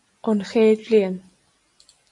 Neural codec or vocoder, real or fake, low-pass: none; real; 10.8 kHz